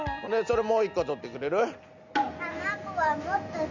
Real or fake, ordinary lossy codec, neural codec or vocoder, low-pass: real; Opus, 64 kbps; none; 7.2 kHz